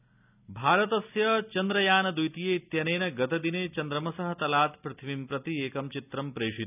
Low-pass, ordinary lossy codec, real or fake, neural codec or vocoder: 3.6 kHz; none; real; none